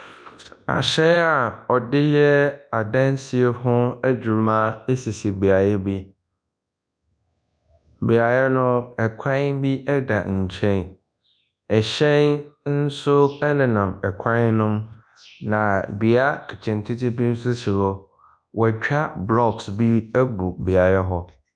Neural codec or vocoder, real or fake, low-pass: codec, 24 kHz, 0.9 kbps, WavTokenizer, large speech release; fake; 9.9 kHz